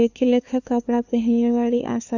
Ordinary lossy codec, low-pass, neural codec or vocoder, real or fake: none; 7.2 kHz; codec, 16 kHz, 2 kbps, FunCodec, trained on LibriTTS, 25 frames a second; fake